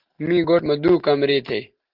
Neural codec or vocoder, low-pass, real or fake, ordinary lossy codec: none; 5.4 kHz; real; Opus, 16 kbps